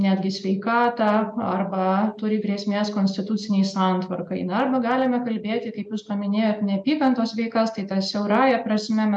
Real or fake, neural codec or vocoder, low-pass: real; none; 9.9 kHz